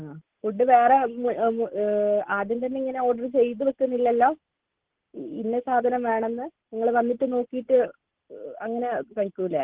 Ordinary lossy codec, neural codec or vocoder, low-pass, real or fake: Opus, 16 kbps; none; 3.6 kHz; real